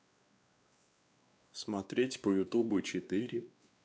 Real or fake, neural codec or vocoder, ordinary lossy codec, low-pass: fake; codec, 16 kHz, 2 kbps, X-Codec, WavLM features, trained on Multilingual LibriSpeech; none; none